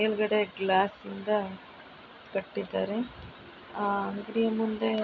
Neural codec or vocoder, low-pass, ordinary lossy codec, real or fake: none; 7.2 kHz; none; real